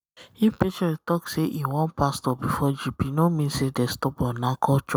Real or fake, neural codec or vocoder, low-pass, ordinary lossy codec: real; none; none; none